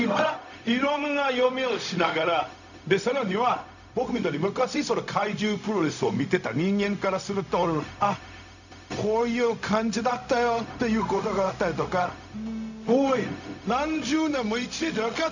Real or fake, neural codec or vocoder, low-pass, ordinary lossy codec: fake; codec, 16 kHz, 0.4 kbps, LongCat-Audio-Codec; 7.2 kHz; none